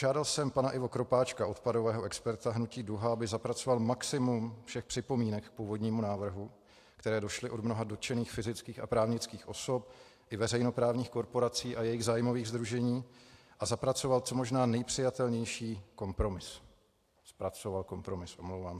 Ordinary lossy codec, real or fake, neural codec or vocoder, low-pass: AAC, 64 kbps; real; none; 14.4 kHz